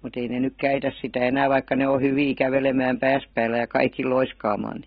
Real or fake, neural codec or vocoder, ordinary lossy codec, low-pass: real; none; AAC, 16 kbps; 7.2 kHz